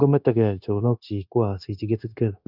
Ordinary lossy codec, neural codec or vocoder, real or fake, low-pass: none; codec, 16 kHz, 0.9 kbps, LongCat-Audio-Codec; fake; 5.4 kHz